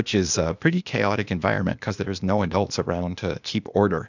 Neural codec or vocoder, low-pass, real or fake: codec, 16 kHz, 0.8 kbps, ZipCodec; 7.2 kHz; fake